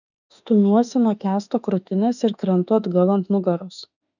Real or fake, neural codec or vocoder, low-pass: fake; autoencoder, 48 kHz, 32 numbers a frame, DAC-VAE, trained on Japanese speech; 7.2 kHz